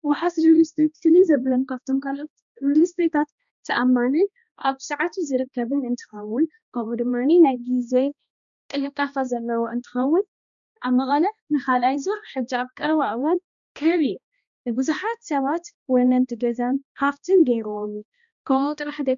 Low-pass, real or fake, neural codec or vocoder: 7.2 kHz; fake; codec, 16 kHz, 1 kbps, X-Codec, HuBERT features, trained on balanced general audio